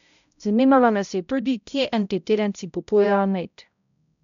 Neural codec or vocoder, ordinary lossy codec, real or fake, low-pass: codec, 16 kHz, 0.5 kbps, X-Codec, HuBERT features, trained on balanced general audio; none; fake; 7.2 kHz